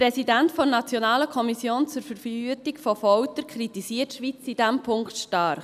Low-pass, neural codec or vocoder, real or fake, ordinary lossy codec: 14.4 kHz; none; real; none